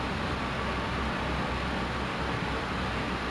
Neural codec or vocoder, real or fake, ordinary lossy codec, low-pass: none; real; none; none